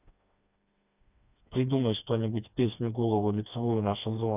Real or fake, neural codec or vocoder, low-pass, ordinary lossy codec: fake; codec, 16 kHz, 2 kbps, FreqCodec, smaller model; 3.6 kHz; none